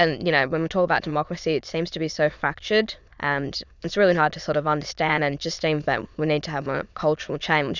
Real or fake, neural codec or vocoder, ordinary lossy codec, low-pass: fake; autoencoder, 22.05 kHz, a latent of 192 numbers a frame, VITS, trained on many speakers; Opus, 64 kbps; 7.2 kHz